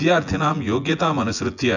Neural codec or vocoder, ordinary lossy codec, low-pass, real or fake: vocoder, 24 kHz, 100 mel bands, Vocos; none; 7.2 kHz; fake